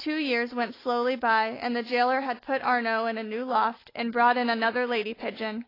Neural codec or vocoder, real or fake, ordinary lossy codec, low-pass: none; real; AAC, 24 kbps; 5.4 kHz